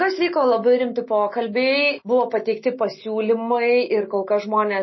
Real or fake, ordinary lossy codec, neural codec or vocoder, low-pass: real; MP3, 24 kbps; none; 7.2 kHz